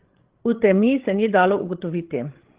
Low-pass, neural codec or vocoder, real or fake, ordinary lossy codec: 3.6 kHz; codec, 16 kHz, 16 kbps, FreqCodec, larger model; fake; Opus, 16 kbps